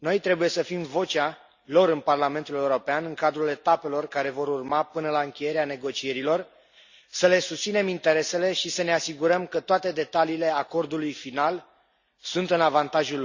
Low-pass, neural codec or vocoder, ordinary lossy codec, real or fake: 7.2 kHz; none; Opus, 64 kbps; real